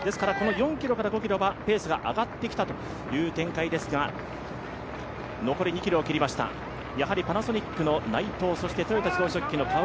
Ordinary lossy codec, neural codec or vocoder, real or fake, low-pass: none; none; real; none